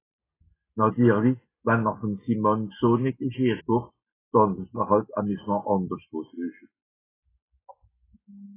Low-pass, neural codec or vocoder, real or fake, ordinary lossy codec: 3.6 kHz; none; real; AAC, 16 kbps